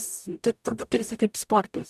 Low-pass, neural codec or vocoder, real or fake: 14.4 kHz; codec, 44.1 kHz, 0.9 kbps, DAC; fake